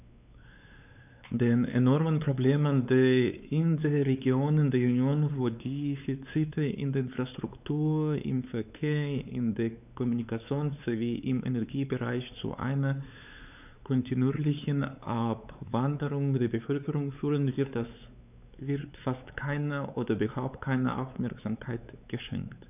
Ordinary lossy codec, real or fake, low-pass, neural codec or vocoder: none; fake; 3.6 kHz; codec, 16 kHz, 4 kbps, X-Codec, WavLM features, trained on Multilingual LibriSpeech